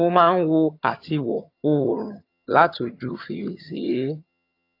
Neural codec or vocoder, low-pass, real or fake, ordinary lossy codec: vocoder, 22.05 kHz, 80 mel bands, HiFi-GAN; 5.4 kHz; fake; AAC, 32 kbps